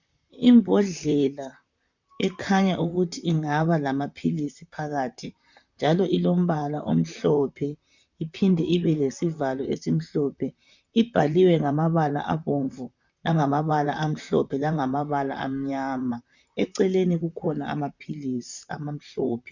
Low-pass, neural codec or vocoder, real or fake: 7.2 kHz; vocoder, 44.1 kHz, 128 mel bands, Pupu-Vocoder; fake